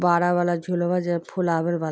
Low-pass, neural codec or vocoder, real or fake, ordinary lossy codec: none; none; real; none